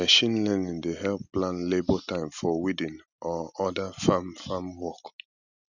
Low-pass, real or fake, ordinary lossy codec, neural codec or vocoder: 7.2 kHz; real; none; none